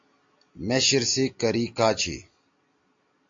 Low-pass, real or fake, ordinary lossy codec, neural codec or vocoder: 7.2 kHz; real; AAC, 48 kbps; none